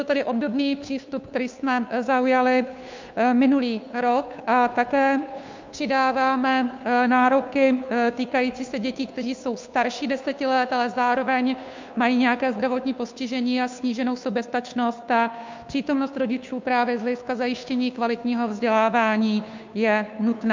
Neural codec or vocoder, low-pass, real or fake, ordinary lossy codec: codec, 16 kHz, 2 kbps, FunCodec, trained on Chinese and English, 25 frames a second; 7.2 kHz; fake; MP3, 64 kbps